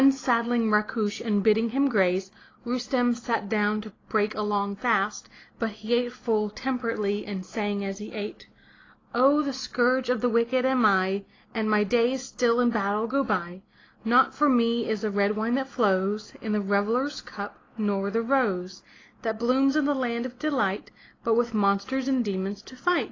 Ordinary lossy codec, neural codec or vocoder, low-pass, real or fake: AAC, 32 kbps; none; 7.2 kHz; real